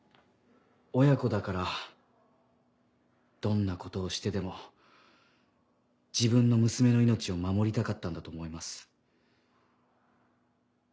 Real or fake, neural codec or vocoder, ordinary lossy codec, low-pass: real; none; none; none